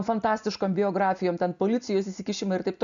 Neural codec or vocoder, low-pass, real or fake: none; 7.2 kHz; real